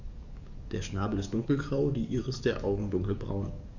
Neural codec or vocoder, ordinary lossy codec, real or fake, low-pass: codec, 44.1 kHz, 7.8 kbps, DAC; none; fake; 7.2 kHz